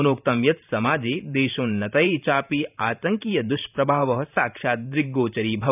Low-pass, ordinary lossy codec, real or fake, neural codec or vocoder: 3.6 kHz; none; fake; vocoder, 44.1 kHz, 128 mel bands every 512 samples, BigVGAN v2